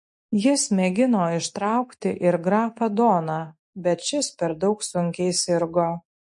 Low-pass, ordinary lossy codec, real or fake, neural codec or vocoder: 10.8 kHz; MP3, 48 kbps; real; none